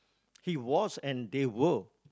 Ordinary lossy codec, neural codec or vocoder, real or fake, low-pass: none; none; real; none